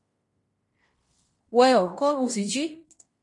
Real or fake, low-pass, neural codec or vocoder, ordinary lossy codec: fake; 10.8 kHz; codec, 16 kHz in and 24 kHz out, 0.9 kbps, LongCat-Audio-Codec, fine tuned four codebook decoder; MP3, 48 kbps